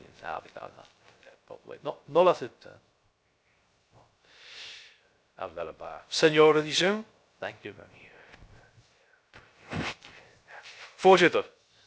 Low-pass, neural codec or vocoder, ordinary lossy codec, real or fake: none; codec, 16 kHz, 0.3 kbps, FocalCodec; none; fake